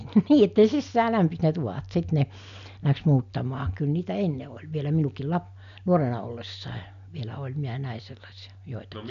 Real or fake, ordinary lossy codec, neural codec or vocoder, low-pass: real; none; none; 7.2 kHz